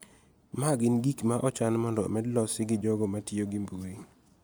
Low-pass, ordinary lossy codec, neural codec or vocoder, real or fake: none; none; none; real